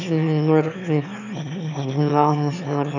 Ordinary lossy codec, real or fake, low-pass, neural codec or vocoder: none; fake; 7.2 kHz; autoencoder, 22.05 kHz, a latent of 192 numbers a frame, VITS, trained on one speaker